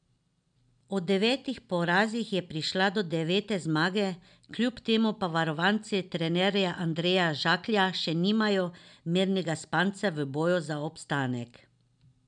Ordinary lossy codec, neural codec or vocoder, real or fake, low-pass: none; none; real; 9.9 kHz